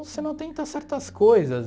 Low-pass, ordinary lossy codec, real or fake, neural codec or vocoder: none; none; real; none